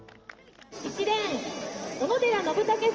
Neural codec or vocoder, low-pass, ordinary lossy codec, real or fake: none; 7.2 kHz; Opus, 24 kbps; real